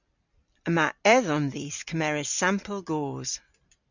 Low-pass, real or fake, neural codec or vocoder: 7.2 kHz; fake; vocoder, 44.1 kHz, 128 mel bands every 256 samples, BigVGAN v2